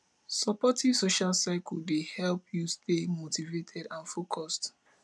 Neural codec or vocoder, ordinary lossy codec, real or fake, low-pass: none; none; real; none